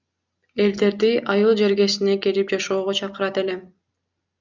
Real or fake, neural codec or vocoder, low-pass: real; none; 7.2 kHz